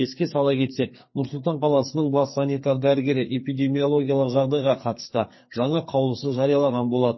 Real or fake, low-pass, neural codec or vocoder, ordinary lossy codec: fake; 7.2 kHz; codec, 44.1 kHz, 2.6 kbps, SNAC; MP3, 24 kbps